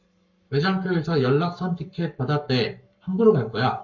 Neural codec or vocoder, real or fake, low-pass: codec, 44.1 kHz, 7.8 kbps, Pupu-Codec; fake; 7.2 kHz